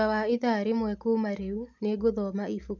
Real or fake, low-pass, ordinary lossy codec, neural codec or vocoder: real; 7.2 kHz; none; none